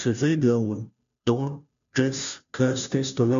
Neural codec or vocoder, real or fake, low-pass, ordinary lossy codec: codec, 16 kHz, 0.5 kbps, FunCodec, trained on Chinese and English, 25 frames a second; fake; 7.2 kHz; MP3, 64 kbps